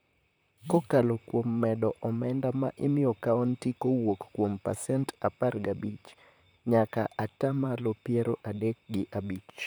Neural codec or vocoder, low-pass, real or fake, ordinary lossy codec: vocoder, 44.1 kHz, 128 mel bands, Pupu-Vocoder; none; fake; none